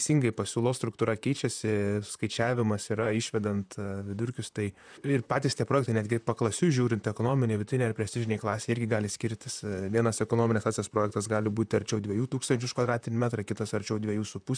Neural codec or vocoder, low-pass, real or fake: vocoder, 44.1 kHz, 128 mel bands, Pupu-Vocoder; 9.9 kHz; fake